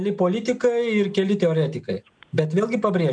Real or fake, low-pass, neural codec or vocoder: real; 9.9 kHz; none